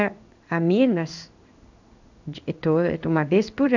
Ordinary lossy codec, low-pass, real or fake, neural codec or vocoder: none; 7.2 kHz; fake; codec, 16 kHz in and 24 kHz out, 1 kbps, XY-Tokenizer